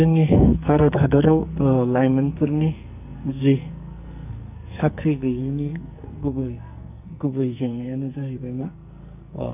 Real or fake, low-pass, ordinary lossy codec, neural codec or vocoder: fake; 3.6 kHz; none; codec, 44.1 kHz, 2.6 kbps, SNAC